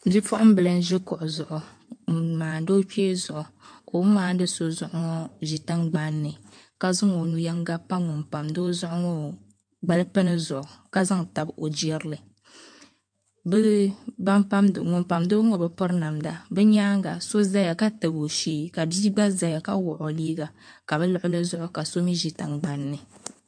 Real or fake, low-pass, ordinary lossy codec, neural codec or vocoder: fake; 9.9 kHz; MP3, 64 kbps; codec, 16 kHz in and 24 kHz out, 2.2 kbps, FireRedTTS-2 codec